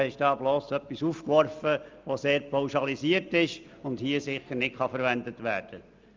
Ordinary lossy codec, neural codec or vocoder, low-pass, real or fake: Opus, 24 kbps; none; 7.2 kHz; real